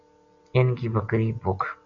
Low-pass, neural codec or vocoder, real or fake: 7.2 kHz; none; real